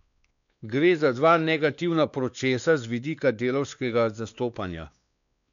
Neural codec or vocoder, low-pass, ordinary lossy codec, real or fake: codec, 16 kHz, 2 kbps, X-Codec, WavLM features, trained on Multilingual LibriSpeech; 7.2 kHz; none; fake